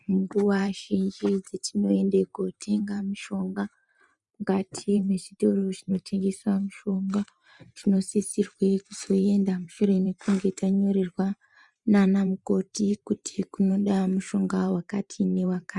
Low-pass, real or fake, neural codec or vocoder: 10.8 kHz; fake; vocoder, 44.1 kHz, 128 mel bands every 512 samples, BigVGAN v2